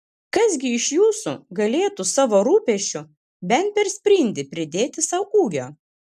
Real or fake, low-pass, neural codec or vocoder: real; 14.4 kHz; none